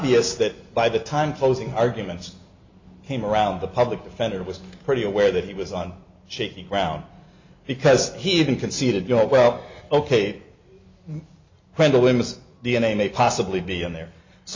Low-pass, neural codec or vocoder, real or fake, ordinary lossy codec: 7.2 kHz; none; real; MP3, 64 kbps